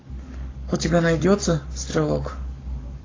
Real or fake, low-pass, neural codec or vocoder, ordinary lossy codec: fake; 7.2 kHz; codec, 44.1 kHz, 7.8 kbps, Pupu-Codec; AAC, 32 kbps